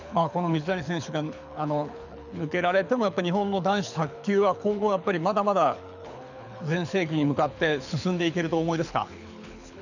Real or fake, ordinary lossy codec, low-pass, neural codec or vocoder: fake; none; 7.2 kHz; codec, 24 kHz, 6 kbps, HILCodec